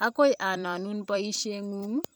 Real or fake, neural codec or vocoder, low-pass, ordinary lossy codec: fake; vocoder, 44.1 kHz, 128 mel bands every 256 samples, BigVGAN v2; none; none